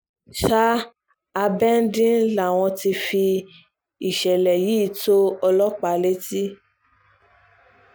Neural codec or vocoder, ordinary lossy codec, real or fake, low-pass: none; none; real; none